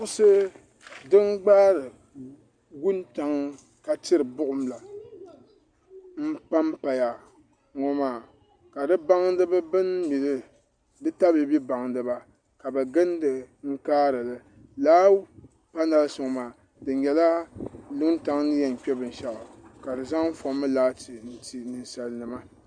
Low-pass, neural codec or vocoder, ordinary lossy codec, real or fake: 9.9 kHz; none; Opus, 64 kbps; real